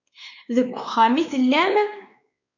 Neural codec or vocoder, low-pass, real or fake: codec, 16 kHz, 2 kbps, X-Codec, WavLM features, trained on Multilingual LibriSpeech; 7.2 kHz; fake